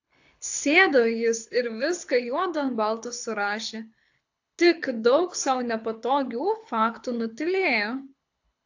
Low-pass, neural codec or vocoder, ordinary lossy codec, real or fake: 7.2 kHz; codec, 24 kHz, 6 kbps, HILCodec; AAC, 48 kbps; fake